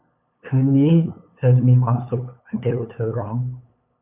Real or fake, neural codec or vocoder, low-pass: fake; codec, 16 kHz, 8 kbps, FunCodec, trained on LibriTTS, 25 frames a second; 3.6 kHz